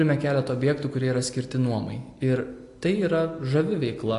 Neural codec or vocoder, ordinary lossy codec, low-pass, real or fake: none; AAC, 48 kbps; 10.8 kHz; real